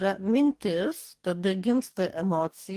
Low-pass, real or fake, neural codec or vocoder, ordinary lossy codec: 14.4 kHz; fake; codec, 44.1 kHz, 2.6 kbps, DAC; Opus, 24 kbps